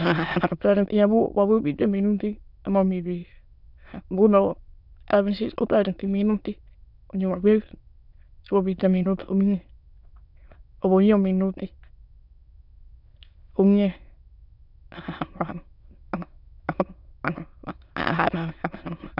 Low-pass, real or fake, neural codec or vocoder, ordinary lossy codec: 5.4 kHz; fake; autoencoder, 22.05 kHz, a latent of 192 numbers a frame, VITS, trained on many speakers; none